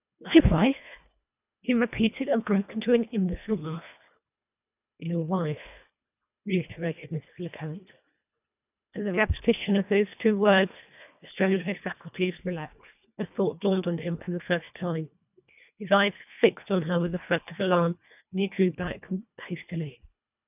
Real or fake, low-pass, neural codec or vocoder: fake; 3.6 kHz; codec, 24 kHz, 1.5 kbps, HILCodec